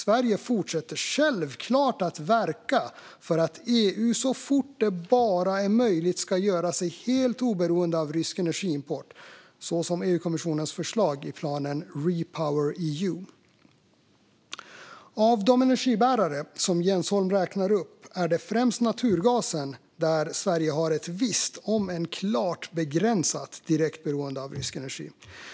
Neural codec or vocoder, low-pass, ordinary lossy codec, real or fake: none; none; none; real